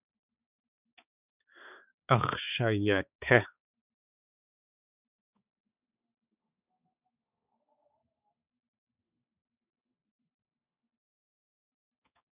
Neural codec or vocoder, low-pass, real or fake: codec, 16 kHz, 4 kbps, X-Codec, HuBERT features, trained on balanced general audio; 3.6 kHz; fake